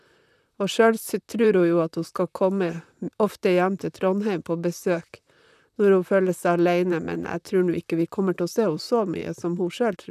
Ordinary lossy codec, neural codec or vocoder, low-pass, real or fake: none; vocoder, 44.1 kHz, 128 mel bands, Pupu-Vocoder; 14.4 kHz; fake